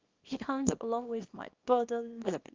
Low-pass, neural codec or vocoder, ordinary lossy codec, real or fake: 7.2 kHz; codec, 24 kHz, 0.9 kbps, WavTokenizer, small release; Opus, 32 kbps; fake